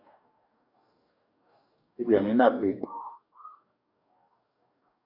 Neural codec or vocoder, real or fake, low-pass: codec, 44.1 kHz, 2.6 kbps, DAC; fake; 5.4 kHz